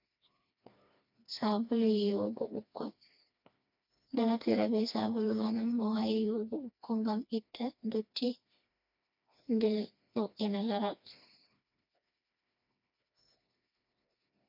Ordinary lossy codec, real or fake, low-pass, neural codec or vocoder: MP3, 48 kbps; fake; 5.4 kHz; codec, 16 kHz, 2 kbps, FreqCodec, smaller model